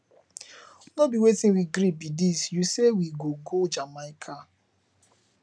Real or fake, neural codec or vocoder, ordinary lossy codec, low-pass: real; none; none; none